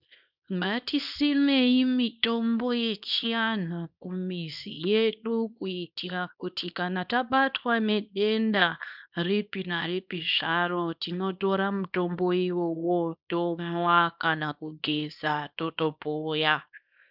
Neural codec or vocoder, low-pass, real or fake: codec, 24 kHz, 0.9 kbps, WavTokenizer, small release; 5.4 kHz; fake